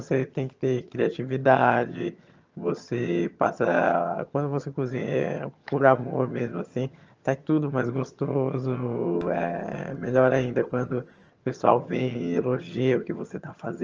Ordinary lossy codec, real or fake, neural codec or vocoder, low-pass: Opus, 24 kbps; fake; vocoder, 22.05 kHz, 80 mel bands, HiFi-GAN; 7.2 kHz